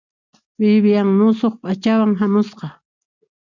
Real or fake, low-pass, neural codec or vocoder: real; 7.2 kHz; none